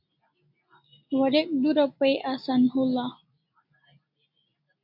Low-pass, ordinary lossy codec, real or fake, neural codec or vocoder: 5.4 kHz; AAC, 48 kbps; real; none